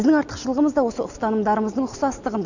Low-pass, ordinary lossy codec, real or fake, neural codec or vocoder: 7.2 kHz; none; real; none